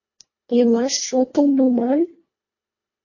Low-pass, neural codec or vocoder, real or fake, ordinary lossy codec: 7.2 kHz; codec, 24 kHz, 1.5 kbps, HILCodec; fake; MP3, 32 kbps